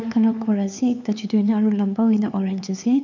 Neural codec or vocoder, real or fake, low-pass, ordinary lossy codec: codec, 16 kHz, 4 kbps, X-Codec, HuBERT features, trained on LibriSpeech; fake; 7.2 kHz; none